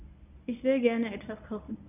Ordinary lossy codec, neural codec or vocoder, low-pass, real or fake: none; codec, 24 kHz, 0.9 kbps, WavTokenizer, medium speech release version 1; 3.6 kHz; fake